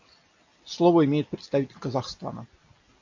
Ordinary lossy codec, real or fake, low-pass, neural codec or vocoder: AAC, 48 kbps; real; 7.2 kHz; none